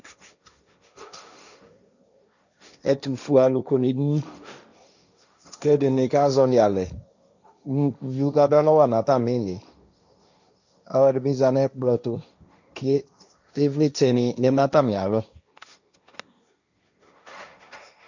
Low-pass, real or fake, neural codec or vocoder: 7.2 kHz; fake; codec, 16 kHz, 1.1 kbps, Voila-Tokenizer